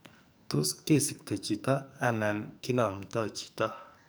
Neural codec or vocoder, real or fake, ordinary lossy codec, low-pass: codec, 44.1 kHz, 2.6 kbps, SNAC; fake; none; none